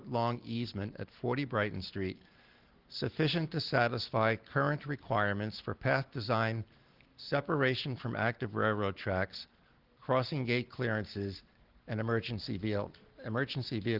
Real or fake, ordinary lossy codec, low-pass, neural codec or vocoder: real; Opus, 16 kbps; 5.4 kHz; none